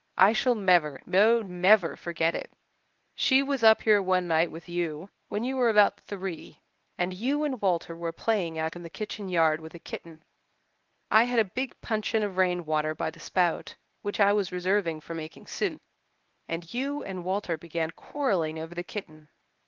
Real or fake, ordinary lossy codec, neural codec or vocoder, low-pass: fake; Opus, 32 kbps; codec, 24 kHz, 0.9 kbps, WavTokenizer, medium speech release version 1; 7.2 kHz